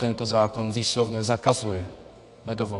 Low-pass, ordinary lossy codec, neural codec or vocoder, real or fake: 10.8 kHz; AAC, 96 kbps; codec, 24 kHz, 0.9 kbps, WavTokenizer, medium music audio release; fake